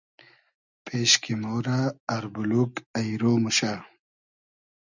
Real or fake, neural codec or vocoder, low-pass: real; none; 7.2 kHz